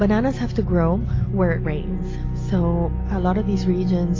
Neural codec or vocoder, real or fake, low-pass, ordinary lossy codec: none; real; 7.2 kHz; AAC, 32 kbps